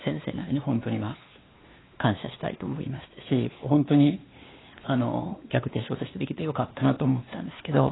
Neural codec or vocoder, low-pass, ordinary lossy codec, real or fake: codec, 16 kHz, 2 kbps, X-Codec, WavLM features, trained on Multilingual LibriSpeech; 7.2 kHz; AAC, 16 kbps; fake